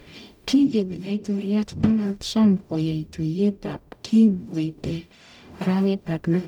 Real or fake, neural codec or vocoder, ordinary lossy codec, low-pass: fake; codec, 44.1 kHz, 0.9 kbps, DAC; none; 19.8 kHz